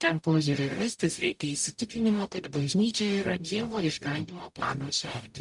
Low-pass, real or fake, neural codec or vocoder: 10.8 kHz; fake; codec, 44.1 kHz, 0.9 kbps, DAC